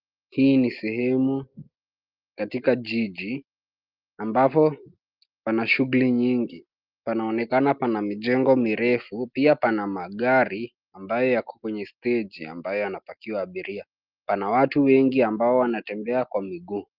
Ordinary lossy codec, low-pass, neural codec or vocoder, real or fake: Opus, 32 kbps; 5.4 kHz; none; real